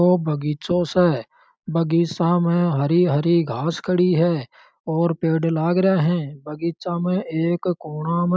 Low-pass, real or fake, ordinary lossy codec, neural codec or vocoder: none; real; none; none